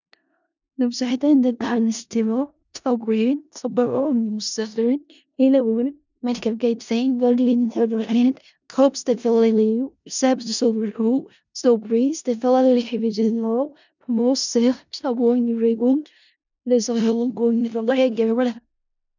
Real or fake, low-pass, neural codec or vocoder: fake; 7.2 kHz; codec, 16 kHz in and 24 kHz out, 0.4 kbps, LongCat-Audio-Codec, four codebook decoder